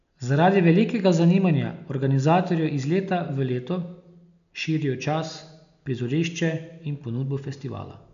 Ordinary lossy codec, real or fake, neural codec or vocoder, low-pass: none; real; none; 7.2 kHz